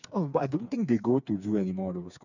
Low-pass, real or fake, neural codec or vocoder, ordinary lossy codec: 7.2 kHz; fake; codec, 16 kHz, 4 kbps, FreqCodec, smaller model; none